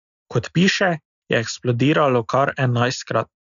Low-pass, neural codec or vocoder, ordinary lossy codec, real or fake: 7.2 kHz; none; none; real